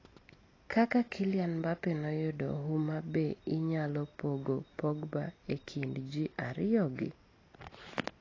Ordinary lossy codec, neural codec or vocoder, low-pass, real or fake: AAC, 32 kbps; none; 7.2 kHz; real